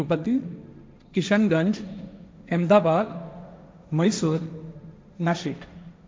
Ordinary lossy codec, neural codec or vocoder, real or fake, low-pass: none; codec, 16 kHz, 1.1 kbps, Voila-Tokenizer; fake; none